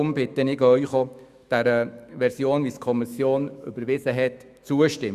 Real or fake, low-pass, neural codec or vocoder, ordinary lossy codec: fake; 14.4 kHz; autoencoder, 48 kHz, 128 numbers a frame, DAC-VAE, trained on Japanese speech; Opus, 64 kbps